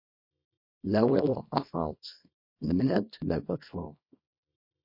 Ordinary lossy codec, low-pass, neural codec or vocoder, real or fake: MP3, 32 kbps; 5.4 kHz; codec, 24 kHz, 0.9 kbps, WavTokenizer, small release; fake